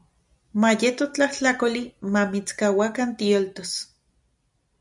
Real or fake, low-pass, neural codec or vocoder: real; 10.8 kHz; none